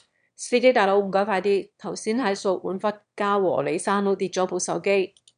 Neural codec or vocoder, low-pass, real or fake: autoencoder, 22.05 kHz, a latent of 192 numbers a frame, VITS, trained on one speaker; 9.9 kHz; fake